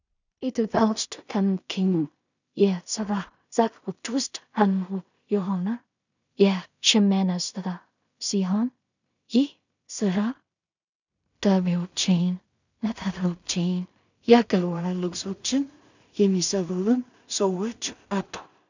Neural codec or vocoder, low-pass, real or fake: codec, 16 kHz in and 24 kHz out, 0.4 kbps, LongCat-Audio-Codec, two codebook decoder; 7.2 kHz; fake